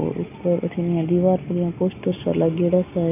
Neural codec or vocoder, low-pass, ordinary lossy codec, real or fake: none; 3.6 kHz; none; real